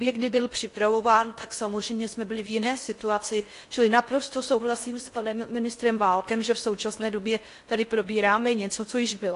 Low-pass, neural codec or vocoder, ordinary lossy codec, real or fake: 10.8 kHz; codec, 16 kHz in and 24 kHz out, 0.6 kbps, FocalCodec, streaming, 4096 codes; AAC, 48 kbps; fake